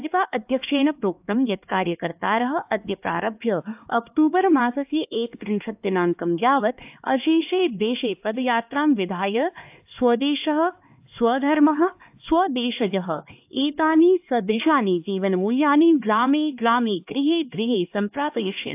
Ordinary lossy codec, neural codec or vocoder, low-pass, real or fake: AAC, 32 kbps; codec, 16 kHz, 2 kbps, X-Codec, HuBERT features, trained on LibriSpeech; 3.6 kHz; fake